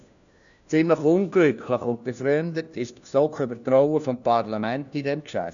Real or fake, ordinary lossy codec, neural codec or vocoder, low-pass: fake; none; codec, 16 kHz, 1 kbps, FunCodec, trained on LibriTTS, 50 frames a second; 7.2 kHz